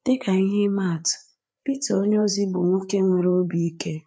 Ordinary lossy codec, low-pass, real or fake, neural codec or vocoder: none; none; fake; codec, 16 kHz, 4 kbps, FreqCodec, larger model